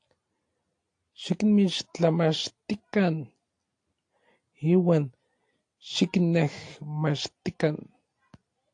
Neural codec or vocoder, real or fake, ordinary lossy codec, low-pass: none; real; AAC, 48 kbps; 9.9 kHz